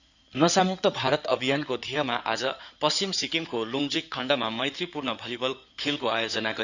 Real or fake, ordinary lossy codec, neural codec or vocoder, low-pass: fake; none; codec, 16 kHz in and 24 kHz out, 2.2 kbps, FireRedTTS-2 codec; 7.2 kHz